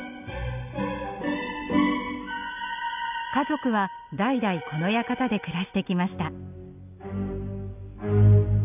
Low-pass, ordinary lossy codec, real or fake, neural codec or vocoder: 3.6 kHz; none; real; none